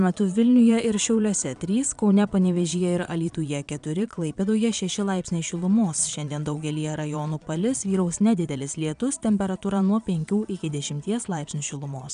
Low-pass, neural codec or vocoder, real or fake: 9.9 kHz; vocoder, 22.05 kHz, 80 mel bands, Vocos; fake